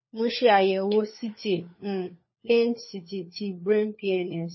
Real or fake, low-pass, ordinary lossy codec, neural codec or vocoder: fake; 7.2 kHz; MP3, 24 kbps; codec, 16 kHz, 4 kbps, FunCodec, trained on LibriTTS, 50 frames a second